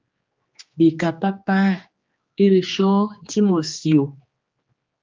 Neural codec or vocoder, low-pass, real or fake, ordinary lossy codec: codec, 16 kHz, 2 kbps, X-Codec, HuBERT features, trained on general audio; 7.2 kHz; fake; Opus, 32 kbps